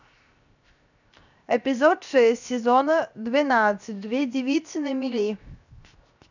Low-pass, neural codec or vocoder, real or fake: 7.2 kHz; codec, 16 kHz, 0.7 kbps, FocalCodec; fake